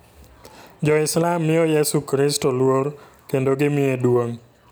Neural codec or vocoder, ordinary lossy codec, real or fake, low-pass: none; none; real; none